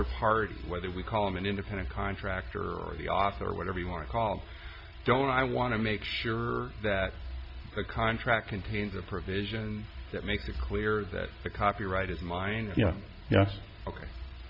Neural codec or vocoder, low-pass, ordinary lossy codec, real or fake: none; 5.4 kHz; AAC, 48 kbps; real